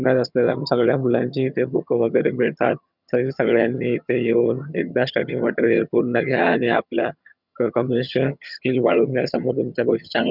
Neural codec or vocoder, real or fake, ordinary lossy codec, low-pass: vocoder, 22.05 kHz, 80 mel bands, HiFi-GAN; fake; none; 5.4 kHz